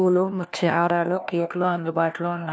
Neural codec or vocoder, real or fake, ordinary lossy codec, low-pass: codec, 16 kHz, 1 kbps, FunCodec, trained on LibriTTS, 50 frames a second; fake; none; none